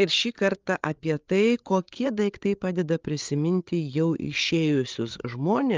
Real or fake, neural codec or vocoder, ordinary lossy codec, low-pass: fake; codec, 16 kHz, 8 kbps, FreqCodec, larger model; Opus, 32 kbps; 7.2 kHz